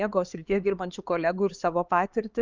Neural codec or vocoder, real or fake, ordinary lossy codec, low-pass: codec, 16 kHz, 4 kbps, X-Codec, HuBERT features, trained on LibriSpeech; fake; Opus, 24 kbps; 7.2 kHz